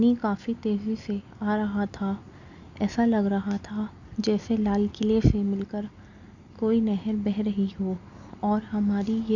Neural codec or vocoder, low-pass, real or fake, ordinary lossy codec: none; 7.2 kHz; real; none